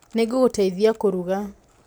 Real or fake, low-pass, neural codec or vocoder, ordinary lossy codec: real; none; none; none